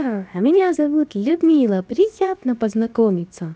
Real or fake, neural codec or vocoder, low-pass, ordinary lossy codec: fake; codec, 16 kHz, about 1 kbps, DyCAST, with the encoder's durations; none; none